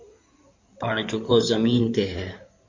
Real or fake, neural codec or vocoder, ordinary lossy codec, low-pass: fake; codec, 16 kHz in and 24 kHz out, 2.2 kbps, FireRedTTS-2 codec; MP3, 48 kbps; 7.2 kHz